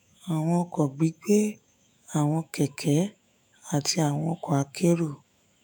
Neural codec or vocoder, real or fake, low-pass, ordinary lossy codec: autoencoder, 48 kHz, 128 numbers a frame, DAC-VAE, trained on Japanese speech; fake; none; none